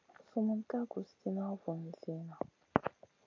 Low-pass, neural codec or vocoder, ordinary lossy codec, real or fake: 7.2 kHz; none; MP3, 48 kbps; real